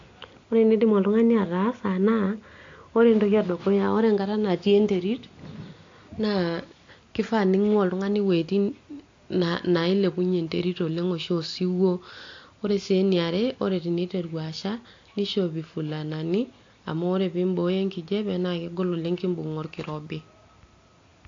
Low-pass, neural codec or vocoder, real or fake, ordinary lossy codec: 7.2 kHz; none; real; AAC, 48 kbps